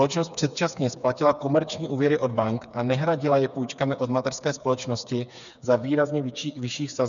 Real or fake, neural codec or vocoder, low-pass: fake; codec, 16 kHz, 4 kbps, FreqCodec, smaller model; 7.2 kHz